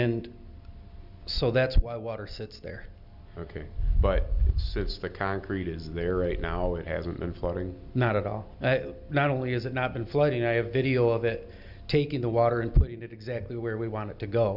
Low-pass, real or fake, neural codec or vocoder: 5.4 kHz; real; none